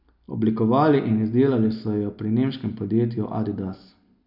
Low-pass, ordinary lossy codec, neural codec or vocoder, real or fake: 5.4 kHz; none; none; real